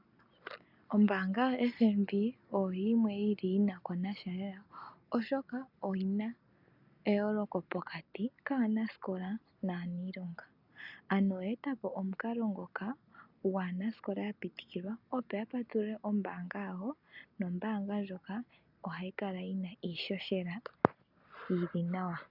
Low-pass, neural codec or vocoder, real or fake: 5.4 kHz; none; real